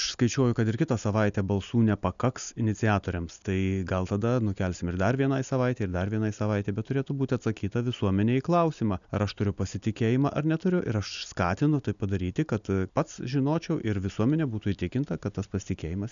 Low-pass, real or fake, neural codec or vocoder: 7.2 kHz; real; none